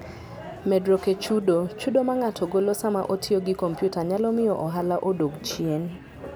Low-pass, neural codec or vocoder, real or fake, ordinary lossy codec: none; none; real; none